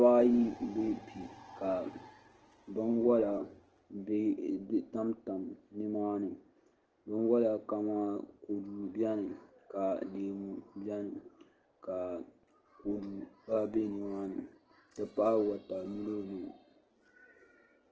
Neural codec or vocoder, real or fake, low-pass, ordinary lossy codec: none; real; 7.2 kHz; Opus, 16 kbps